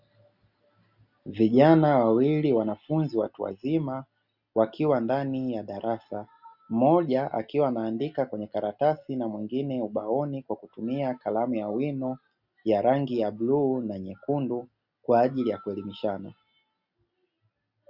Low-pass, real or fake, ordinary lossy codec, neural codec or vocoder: 5.4 kHz; real; Opus, 64 kbps; none